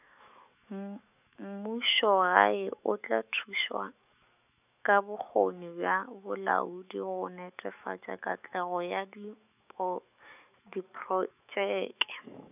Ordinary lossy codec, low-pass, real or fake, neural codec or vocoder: none; 3.6 kHz; fake; autoencoder, 48 kHz, 128 numbers a frame, DAC-VAE, trained on Japanese speech